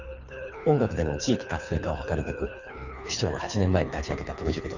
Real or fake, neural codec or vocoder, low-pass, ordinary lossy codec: fake; codec, 24 kHz, 3 kbps, HILCodec; 7.2 kHz; none